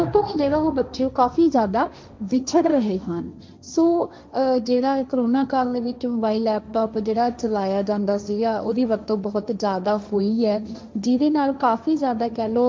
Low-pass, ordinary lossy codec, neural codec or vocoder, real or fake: none; none; codec, 16 kHz, 1.1 kbps, Voila-Tokenizer; fake